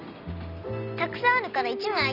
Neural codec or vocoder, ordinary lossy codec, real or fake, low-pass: vocoder, 44.1 kHz, 128 mel bands every 256 samples, BigVGAN v2; none; fake; 5.4 kHz